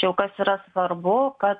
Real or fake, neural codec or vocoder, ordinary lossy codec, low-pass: real; none; AAC, 64 kbps; 9.9 kHz